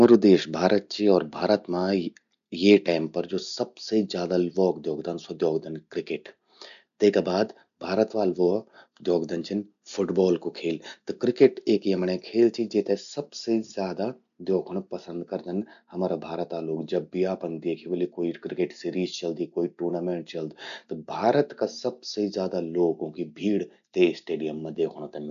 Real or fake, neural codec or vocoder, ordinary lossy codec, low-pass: real; none; none; 7.2 kHz